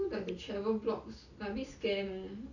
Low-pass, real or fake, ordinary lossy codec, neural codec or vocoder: 7.2 kHz; real; none; none